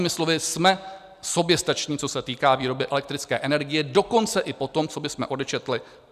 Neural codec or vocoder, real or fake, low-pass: none; real; 14.4 kHz